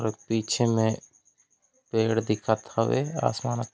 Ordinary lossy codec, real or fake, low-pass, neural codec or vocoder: none; real; none; none